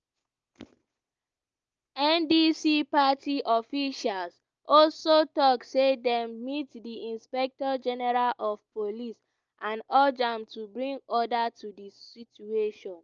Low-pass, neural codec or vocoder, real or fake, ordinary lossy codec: 7.2 kHz; none; real; Opus, 24 kbps